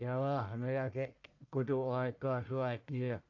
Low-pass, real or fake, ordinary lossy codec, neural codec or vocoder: 7.2 kHz; fake; AAC, 48 kbps; codec, 44.1 kHz, 1.7 kbps, Pupu-Codec